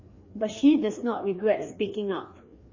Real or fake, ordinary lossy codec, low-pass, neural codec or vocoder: fake; MP3, 32 kbps; 7.2 kHz; codec, 16 kHz, 2 kbps, FreqCodec, larger model